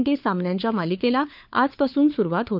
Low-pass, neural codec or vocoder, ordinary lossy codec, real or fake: 5.4 kHz; codec, 16 kHz, 4 kbps, FunCodec, trained on LibriTTS, 50 frames a second; none; fake